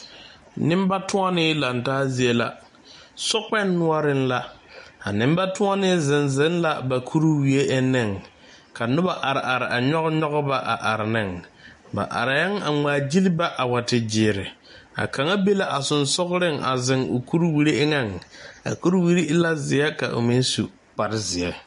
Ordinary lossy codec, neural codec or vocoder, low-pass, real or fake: MP3, 48 kbps; none; 14.4 kHz; real